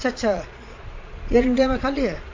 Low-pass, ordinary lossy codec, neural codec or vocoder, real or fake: 7.2 kHz; MP3, 48 kbps; vocoder, 22.05 kHz, 80 mel bands, Vocos; fake